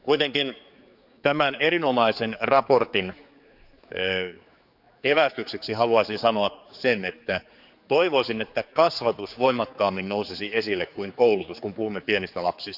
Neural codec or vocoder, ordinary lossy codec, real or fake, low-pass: codec, 16 kHz, 4 kbps, X-Codec, HuBERT features, trained on general audio; none; fake; 5.4 kHz